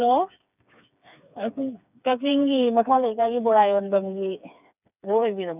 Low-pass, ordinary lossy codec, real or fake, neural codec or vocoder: 3.6 kHz; none; fake; codec, 16 kHz, 4 kbps, FreqCodec, smaller model